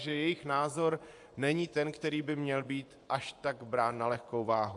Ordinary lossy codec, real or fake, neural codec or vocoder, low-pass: AAC, 64 kbps; fake; vocoder, 24 kHz, 100 mel bands, Vocos; 10.8 kHz